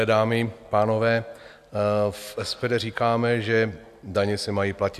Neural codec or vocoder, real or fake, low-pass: none; real; 14.4 kHz